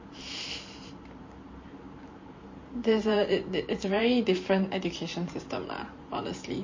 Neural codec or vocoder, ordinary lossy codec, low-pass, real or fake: vocoder, 44.1 kHz, 128 mel bands every 512 samples, BigVGAN v2; MP3, 32 kbps; 7.2 kHz; fake